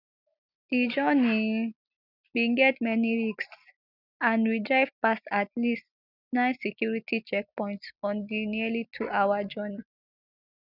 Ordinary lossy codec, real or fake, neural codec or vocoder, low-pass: none; real; none; 5.4 kHz